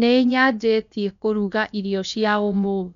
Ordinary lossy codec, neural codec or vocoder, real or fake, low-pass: none; codec, 16 kHz, about 1 kbps, DyCAST, with the encoder's durations; fake; 7.2 kHz